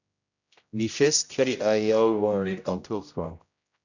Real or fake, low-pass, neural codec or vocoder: fake; 7.2 kHz; codec, 16 kHz, 0.5 kbps, X-Codec, HuBERT features, trained on general audio